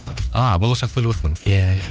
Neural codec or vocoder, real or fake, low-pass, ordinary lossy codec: codec, 16 kHz, 2 kbps, X-Codec, HuBERT features, trained on LibriSpeech; fake; none; none